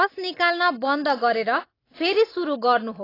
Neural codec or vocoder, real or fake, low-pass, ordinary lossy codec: none; real; 5.4 kHz; AAC, 24 kbps